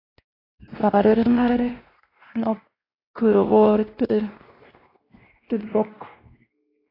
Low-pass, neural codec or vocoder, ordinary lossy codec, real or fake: 5.4 kHz; codec, 16 kHz, 1 kbps, X-Codec, WavLM features, trained on Multilingual LibriSpeech; AAC, 24 kbps; fake